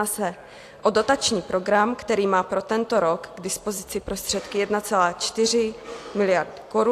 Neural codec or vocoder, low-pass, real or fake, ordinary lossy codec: none; 14.4 kHz; real; AAC, 64 kbps